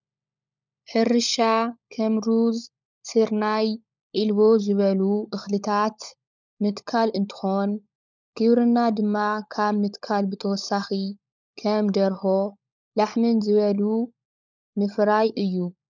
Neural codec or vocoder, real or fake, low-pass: codec, 16 kHz, 16 kbps, FunCodec, trained on LibriTTS, 50 frames a second; fake; 7.2 kHz